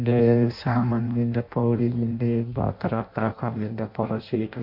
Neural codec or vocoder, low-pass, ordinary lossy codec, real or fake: codec, 16 kHz in and 24 kHz out, 0.6 kbps, FireRedTTS-2 codec; 5.4 kHz; MP3, 32 kbps; fake